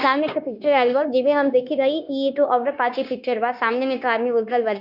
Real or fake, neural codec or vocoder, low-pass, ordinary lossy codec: fake; codec, 16 kHz, 0.9 kbps, LongCat-Audio-Codec; 5.4 kHz; none